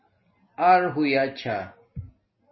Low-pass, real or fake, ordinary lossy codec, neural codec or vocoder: 7.2 kHz; real; MP3, 24 kbps; none